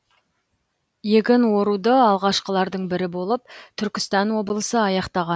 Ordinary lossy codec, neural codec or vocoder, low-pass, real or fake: none; none; none; real